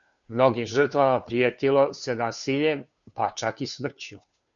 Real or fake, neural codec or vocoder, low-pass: fake; codec, 16 kHz, 2 kbps, FunCodec, trained on Chinese and English, 25 frames a second; 7.2 kHz